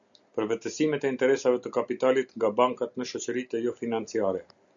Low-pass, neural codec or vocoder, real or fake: 7.2 kHz; none; real